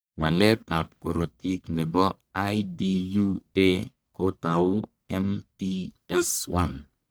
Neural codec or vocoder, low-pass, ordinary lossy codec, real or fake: codec, 44.1 kHz, 1.7 kbps, Pupu-Codec; none; none; fake